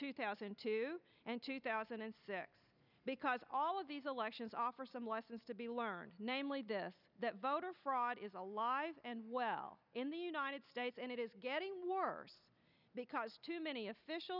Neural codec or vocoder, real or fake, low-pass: none; real; 5.4 kHz